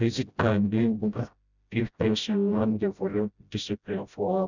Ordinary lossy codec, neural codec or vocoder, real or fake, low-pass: none; codec, 16 kHz, 0.5 kbps, FreqCodec, smaller model; fake; 7.2 kHz